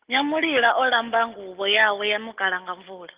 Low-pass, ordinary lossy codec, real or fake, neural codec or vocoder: 3.6 kHz; Opus, 32 kbps; real; none